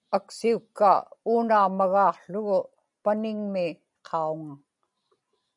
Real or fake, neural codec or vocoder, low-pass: real; none; 10.8 kHz